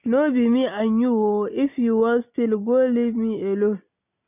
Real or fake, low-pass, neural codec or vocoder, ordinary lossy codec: real; 3.6 kHz; none; none